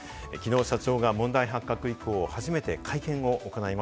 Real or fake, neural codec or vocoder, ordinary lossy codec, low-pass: real; none; none; none